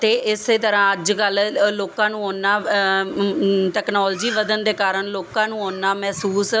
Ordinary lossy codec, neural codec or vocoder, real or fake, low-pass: none; none; real; none